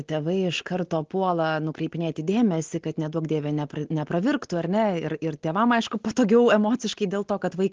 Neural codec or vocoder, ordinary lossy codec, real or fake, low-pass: none; Opus, 16 kbps; real; 7.2 kHz